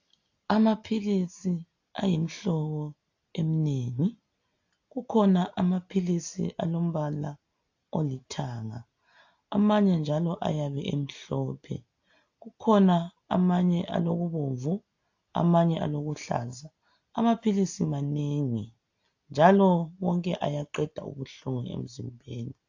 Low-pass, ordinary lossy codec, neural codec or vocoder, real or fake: 7.2 kHz; AAC, 48 kbps; none; real